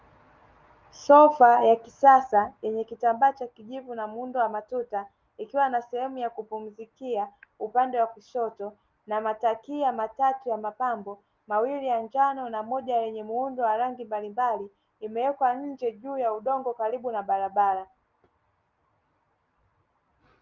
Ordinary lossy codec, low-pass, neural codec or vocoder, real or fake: Opus, 32 kbps; 7.2 kHz; none; real